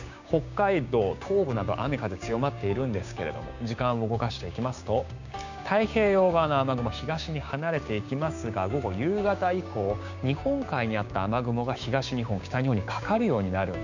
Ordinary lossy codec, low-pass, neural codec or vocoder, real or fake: none; 7.2 kHz; codec, 16 kHz, 6 kbps, DAC; fake